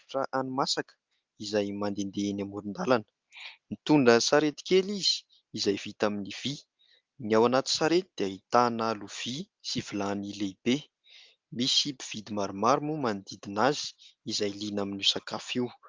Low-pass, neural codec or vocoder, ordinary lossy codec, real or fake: 7.2 kHz; none; Opus, 32 kbps; real